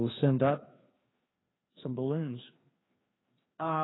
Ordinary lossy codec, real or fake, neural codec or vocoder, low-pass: AAC, 16 kbps; fake; codec, 16 kHz, 2 kbps, FreqCodec, larger model; 7.2 kHz